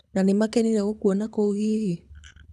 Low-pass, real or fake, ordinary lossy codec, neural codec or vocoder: none; fake; none; codec, 24 kHz, 6 kbps, HILCodec